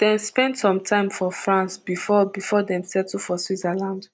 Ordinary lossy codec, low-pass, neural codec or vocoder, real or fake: none; none; none; real